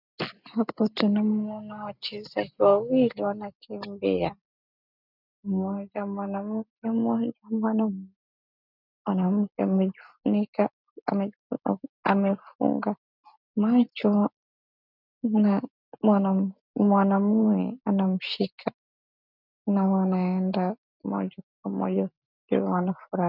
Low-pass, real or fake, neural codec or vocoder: 5.4 kHz; real; none